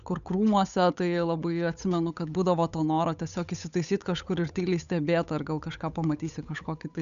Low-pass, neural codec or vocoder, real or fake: 7.2 kHz; codec, 16 kHz, 8 kbps, FunCodec, trained on Chinese and English, 25 frames a second; fake